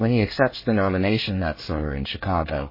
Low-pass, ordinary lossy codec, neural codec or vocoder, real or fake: 5.4 kHz; MP3, 24 kbps; codec, 24 kHz, 1 kbps, SNAC; fake